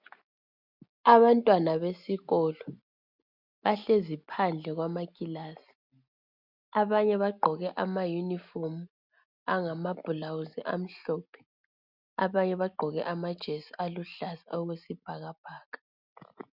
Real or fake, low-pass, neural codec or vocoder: real; 5.4 kHz; none